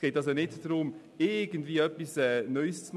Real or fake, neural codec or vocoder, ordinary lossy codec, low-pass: real; none; none; none